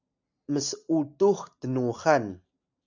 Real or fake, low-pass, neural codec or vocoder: real; 7.2 kHz; none